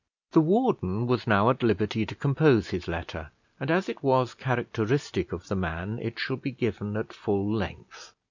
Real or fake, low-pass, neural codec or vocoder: real; 7.2 kHz; none